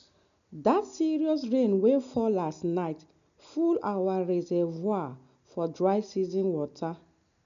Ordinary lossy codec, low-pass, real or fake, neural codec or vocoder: none; 7.2 kHz; real; none